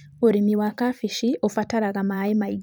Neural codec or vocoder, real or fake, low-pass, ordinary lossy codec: vocoder, 44.1 kHz, 128 mel bands every 512 samples, BigVGAN v2; fake; none; none